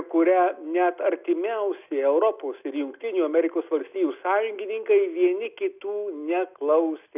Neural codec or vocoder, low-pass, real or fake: none; 3.6 kHz; real